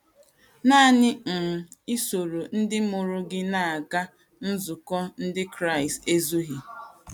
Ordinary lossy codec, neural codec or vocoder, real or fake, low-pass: none; none; real; 19.8 kHz